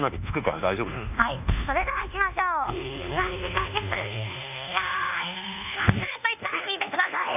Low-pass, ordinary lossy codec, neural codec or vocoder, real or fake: 3.6 kHz; none; codec, 24 kHz, 1.2 kbps, DualCodec; fake